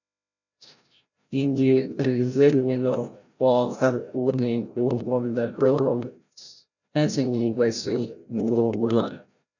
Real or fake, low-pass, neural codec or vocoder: fake; 7.2 kHz; codec, 16 kHz, 0.5 kbps, FreqCodec, larger model